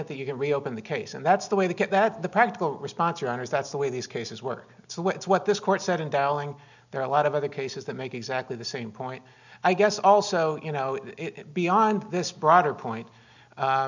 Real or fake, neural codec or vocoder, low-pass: real; none; 7.2 kHz